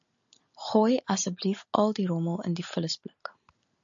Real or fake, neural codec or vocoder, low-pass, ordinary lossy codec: real; none; 7.2 kHz; AAC, 64 kbps